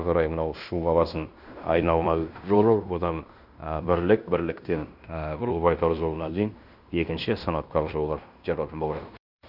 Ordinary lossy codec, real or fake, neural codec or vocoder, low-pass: none; fake; codec, 16 kHz in and 24 kHz out, 0.9 kbps, LongCat-Audio-Codec, fine tuned four codebook decoder; 5.4 kHz